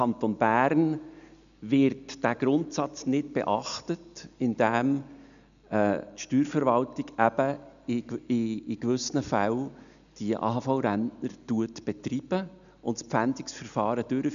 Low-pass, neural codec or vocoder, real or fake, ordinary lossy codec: 7.2 kHz; none; real; none